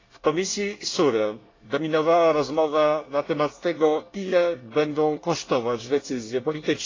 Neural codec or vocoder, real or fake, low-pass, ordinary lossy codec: codec, 24 kHz, 1 kbps, SNAC; fake; 7.2 kHz; AAC, 32 kbps